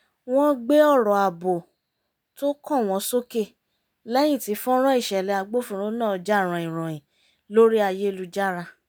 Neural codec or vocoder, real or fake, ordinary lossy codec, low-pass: none; real; none; none